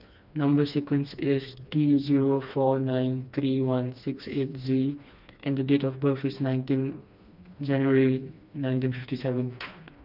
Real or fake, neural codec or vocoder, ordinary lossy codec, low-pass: fake; codec, 16 kHz, 2 kbps, FreqCodec, smaller model; none; 5.4 kHz